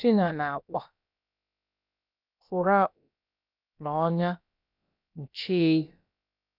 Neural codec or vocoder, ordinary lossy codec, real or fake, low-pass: codec, 16 kHz, about 1 kbps, DyCAST, with the encoder's durations; none; fake; 5.4 kHz